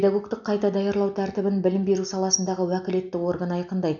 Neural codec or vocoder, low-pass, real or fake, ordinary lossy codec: none; 7.2 kHz; real; none